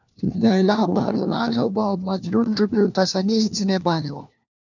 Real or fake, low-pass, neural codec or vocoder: fake; 7.2 kHz; codec, 16 kHz, 1 kbps, FunCodec, trained on LibriTTS, 50 frames a second